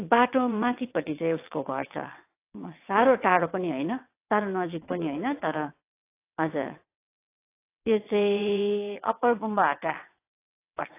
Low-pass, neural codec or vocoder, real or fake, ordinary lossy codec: 3.6 kHz; vocoder, 22.05 kHz, 80 mel bands, WaveNeXt; fake; AAC, 24 kbps